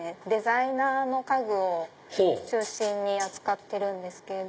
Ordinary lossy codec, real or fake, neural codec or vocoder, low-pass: none; real; none; none